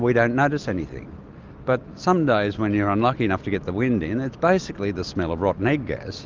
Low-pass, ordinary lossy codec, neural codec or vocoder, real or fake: 7.2 kHz; Opus, 24 kbps; none; real